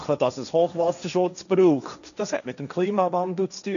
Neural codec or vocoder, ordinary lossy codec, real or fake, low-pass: codec, 16 kHz, 1.1 kbps, Voila-Tokenizer; none; fake; 7.2 kHz